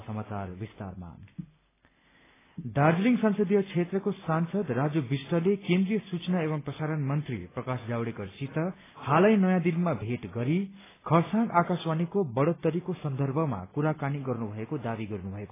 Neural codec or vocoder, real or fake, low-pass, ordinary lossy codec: none; real; 3.6 kHz; AAC, 16 kbps